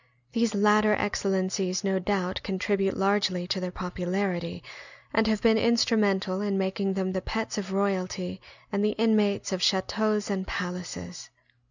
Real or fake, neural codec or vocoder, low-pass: real; none; 7.2 kHz